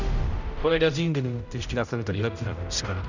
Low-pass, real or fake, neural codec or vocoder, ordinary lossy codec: 7.2 kHz; fake; codec, 16 kHz, 0.5 kbps, X-Codec, HuBERT features, trained on general audio; none